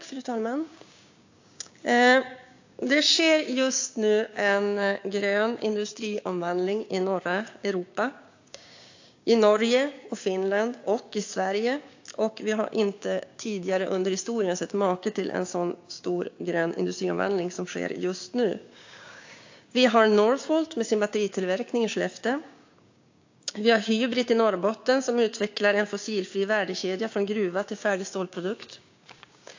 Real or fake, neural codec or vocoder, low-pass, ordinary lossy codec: fake; codec, 16 kHz, 6 kbps, DAC; 7.2 kHz; AAC, 48 kbps